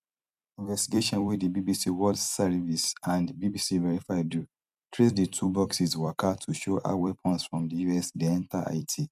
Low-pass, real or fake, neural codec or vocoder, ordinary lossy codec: 14.4 kHz; fake; vocoder, 44.1 kHz, 128 mel bands every 256 samples, BigVGAN v2; none